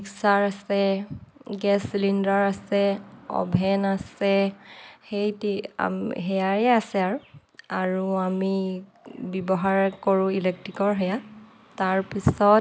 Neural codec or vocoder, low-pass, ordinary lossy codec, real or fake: none; none; none; real